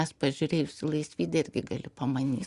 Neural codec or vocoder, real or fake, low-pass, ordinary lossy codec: none; real; 10.8 kHz; Opus, 64 kbps